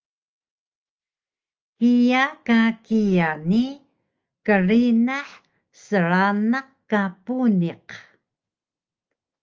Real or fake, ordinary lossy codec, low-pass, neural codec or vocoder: fake; Opus, 24 kbps; 7.2 kHz; autoencoder, 48 kHz, 128 numbers a frame, DAC-VAE, trained on Japanese speech